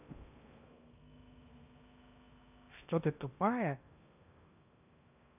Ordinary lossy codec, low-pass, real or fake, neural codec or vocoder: none; 3.6 kHz; fake; codec, 16 kHz in and 24 kHz out, 0.8 kbps, FocalCodec, streaming, 65536 codes